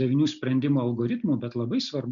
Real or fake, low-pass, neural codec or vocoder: real; 7.2 kHz; none